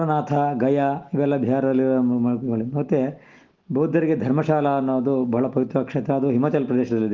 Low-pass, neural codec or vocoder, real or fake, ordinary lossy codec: 7.2 kHz; none; real; Opus, 16 kbps